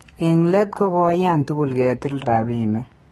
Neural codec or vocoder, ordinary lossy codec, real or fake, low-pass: codec, 32 kHz, 1.9 kbps, SNAC; AAC, 32 kbps; fake; 14.4 kHz